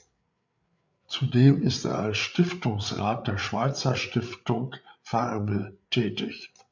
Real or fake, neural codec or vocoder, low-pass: fake; codec, 16 kHz, 8 kbps, FreqCodec, larger model; 7.2 kHz